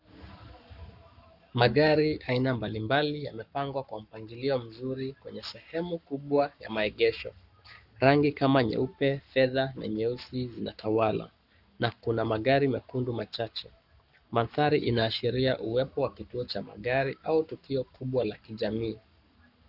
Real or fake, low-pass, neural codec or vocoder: fake; 5.4 kHz; codec, 44.1 kHz, 7.8 kbps, Pupu-Codec